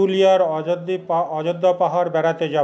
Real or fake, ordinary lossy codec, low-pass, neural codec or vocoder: real; none; none; none